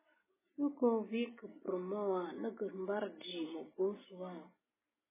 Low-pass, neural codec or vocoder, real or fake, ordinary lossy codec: 3.6 kHz; none; real; MP3, 16 kbps